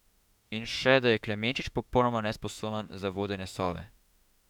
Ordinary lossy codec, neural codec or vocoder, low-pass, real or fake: none; autoencoder, 48 kHz, 32 numbers a frame, DAC-VAE, trained on Japanese speech; 19.8 kHz; fake